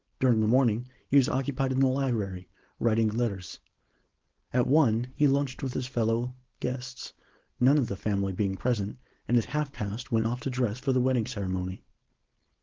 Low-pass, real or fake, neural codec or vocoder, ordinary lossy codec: 7.2 kHz; fake; codec, 16 kHz, 4.8 kbps, FACodec; Opus, 16 kbps